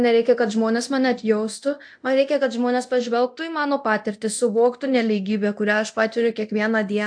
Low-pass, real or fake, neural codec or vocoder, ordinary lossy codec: 9.9 kHz; fake; codec, 24 kHz, 0.9 kbps, DualCodec; AAC, 64 kbps